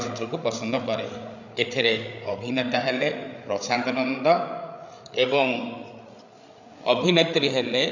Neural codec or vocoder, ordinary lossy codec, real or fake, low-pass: codec, 16 kHz, 8 kbps, FreqCodec, larger model; none; fake; 7.2 kHz